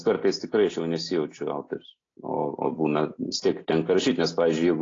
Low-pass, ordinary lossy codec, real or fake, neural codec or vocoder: 7.2 kHz; AAC, 32 kbps; real; none